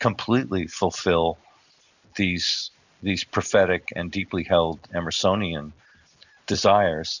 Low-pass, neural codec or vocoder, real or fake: 7.2 kHz; none; real